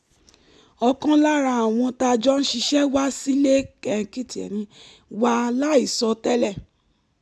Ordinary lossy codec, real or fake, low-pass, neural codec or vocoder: none; real; none; none